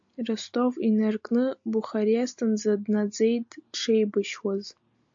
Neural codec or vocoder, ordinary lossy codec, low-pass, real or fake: none; AAC, 64 kbps; 7.2 kHz; real